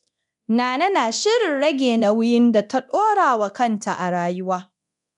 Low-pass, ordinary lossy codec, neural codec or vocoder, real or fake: 10.8 kHz; none; codec, 24 kHz, 0.9 kbps, DualCodec; fake